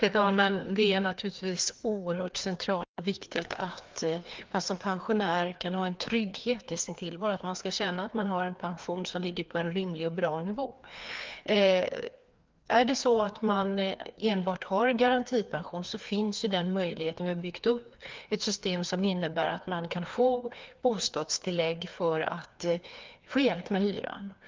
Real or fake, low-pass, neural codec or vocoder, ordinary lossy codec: fake; 7.2 kHz; codec, 16 kHz, 2 kbps, FreqCodec, larger model; Opus, 32 kbps